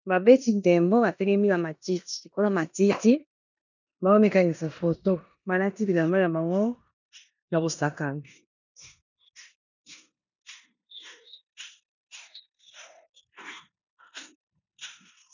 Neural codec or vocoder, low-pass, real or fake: codec, 16 kHz in and 24 kHz out, 0.9 kbps, LongCat-Audio-Codec, four codebook decoder; 7.2 kHz; fake